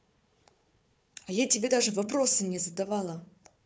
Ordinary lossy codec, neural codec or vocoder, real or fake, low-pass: none; codec, 16 kHz, 4 kbps, FunCodec, trained on Chinese and English, 50 frames a second; fake; none